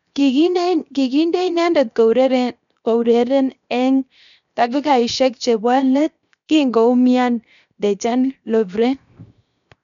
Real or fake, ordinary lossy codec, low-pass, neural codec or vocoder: fake; none; 7.2 kHz; codec, 16 kHz, 0.7 kbps, FocalCodec